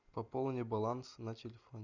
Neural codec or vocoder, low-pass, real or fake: none; 7.2 kHz; real